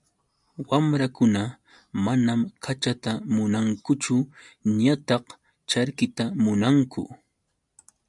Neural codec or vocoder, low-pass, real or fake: none; 10.8 kHz; real